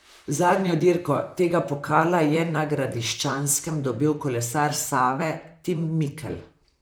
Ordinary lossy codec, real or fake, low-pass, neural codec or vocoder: none; fake; none; vocoder, 44.1 kHz, 128 mel bands, Pupu-Vocoder